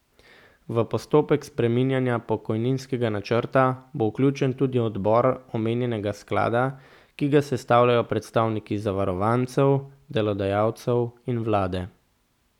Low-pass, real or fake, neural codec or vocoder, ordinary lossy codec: 19.8 kHz; real; none; none